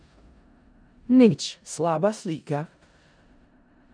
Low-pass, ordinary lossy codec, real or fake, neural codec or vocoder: 9.9 kHz; none; fake; codec, 16 kHz in and 24 kHz out, 0.4 kbps, LongCat-Audio-Codec, four codebook decoder